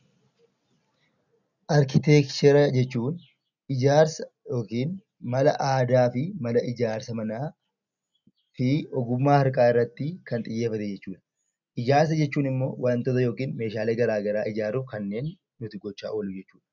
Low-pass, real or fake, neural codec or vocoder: 7.2 kHz; real; none